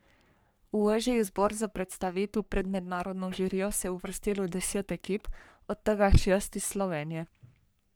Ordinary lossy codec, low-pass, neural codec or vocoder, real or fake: none; none; codec, 44.1 kHz, 3.4 kbps, Pupu-Codec; fake